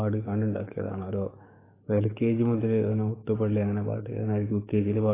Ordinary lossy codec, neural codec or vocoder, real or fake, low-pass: AAC, 16 kbps; codec, 44.1 kHz, 7.8 kbps, DAC; fake; 3.6 kHz